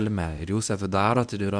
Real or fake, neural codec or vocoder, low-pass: fake; codec, 24 kHz, 0.9 kbps, WavTokenizer, medium speech release version 1; 9.9 kHz